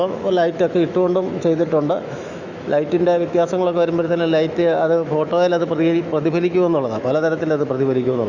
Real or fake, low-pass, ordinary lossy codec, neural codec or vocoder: fake; 7.2 kHz; Opus, 64 kbps; autoencoder, 48 kHz, 128 numbers a frame, DAC-VAE, trained on Japanese speech